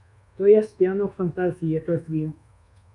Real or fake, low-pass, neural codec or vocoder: fake; 10.8 kHz; codec, 24 kHz, 1.2 kbps, DualCodec